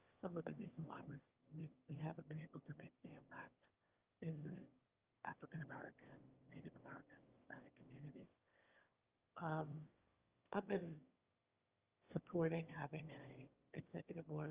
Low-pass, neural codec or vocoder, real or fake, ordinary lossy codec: 3.6 kHz; autoencoder, 22.05 kHz, a latent of 192 numbers a frame, VITS, trained on one speaker; fake; Opus, 32 kbps